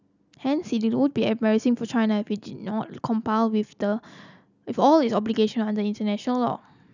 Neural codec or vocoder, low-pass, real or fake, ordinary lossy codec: none; 7.2 kHz; real; none